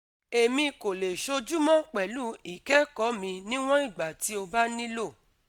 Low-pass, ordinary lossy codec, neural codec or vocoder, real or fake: none; none; none; real